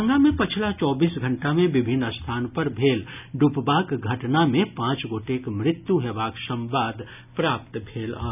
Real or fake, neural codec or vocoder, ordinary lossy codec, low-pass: real; none; none; 3.6 kHz